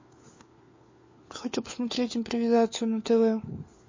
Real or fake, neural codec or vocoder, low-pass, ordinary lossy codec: fake; codec, 16 kHz, 4 kbps, FunCodec, trained on LibriTTS, 50 frames a second; 7.2 kHz; MP3, 32 kbps